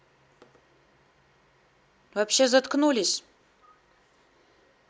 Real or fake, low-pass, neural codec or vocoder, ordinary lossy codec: real; none; none; none